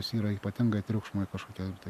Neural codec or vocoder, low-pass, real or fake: none; 14.4 kHz; real